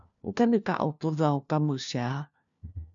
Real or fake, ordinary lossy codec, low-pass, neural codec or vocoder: fake; MP3, 96 kbps; 7.2 kHz; codec, 16 kHz, 1 kbps, FunCodec, trained on LibriTTS, 50 frames a second